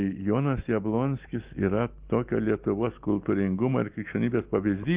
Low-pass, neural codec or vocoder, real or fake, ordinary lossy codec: 3.6 kHz; none; real; Opus, 32 kbps